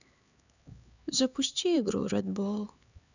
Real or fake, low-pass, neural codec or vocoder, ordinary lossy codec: fake; 7.2 kHz; codec, 16 kHz, 4 kbps, X-Codec, HuBERT features, trained on LibriSpeech; none